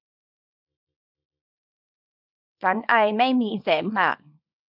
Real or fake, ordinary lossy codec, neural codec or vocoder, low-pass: fake; none; codec, 24 kHz, 0.9 kbps, WavTokenizer, small release; 5.4 kHz